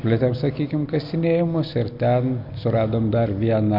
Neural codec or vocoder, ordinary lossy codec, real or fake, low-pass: none; MP3, 48 kbps; real; 5.4 kHz